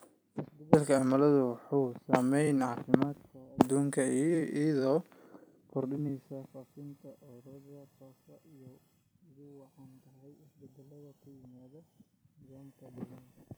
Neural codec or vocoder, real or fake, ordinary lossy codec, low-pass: vocoder, 44.1 kHz, 128 mel bands every 256 samples, BigVGAN v2; fake; none; none